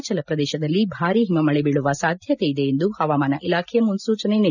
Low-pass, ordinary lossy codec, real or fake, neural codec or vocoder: 7.2 kHz; none; real; none